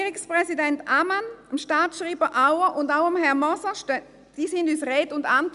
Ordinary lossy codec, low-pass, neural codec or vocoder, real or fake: none; 10.8 kHz; none; real